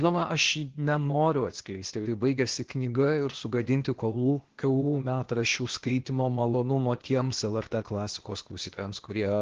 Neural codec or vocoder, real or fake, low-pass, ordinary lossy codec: codec, 16 kHz, 0.8 kbps, ZipCodec; fake; 7.2 kHz; Opus, 16 kbps